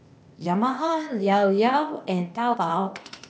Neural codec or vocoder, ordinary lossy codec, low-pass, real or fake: codec, 16 kHz, 0.8 kbps, ZipCodec; none; none; fake